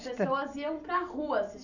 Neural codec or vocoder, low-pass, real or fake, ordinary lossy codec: none; 7.2 kHz; real; none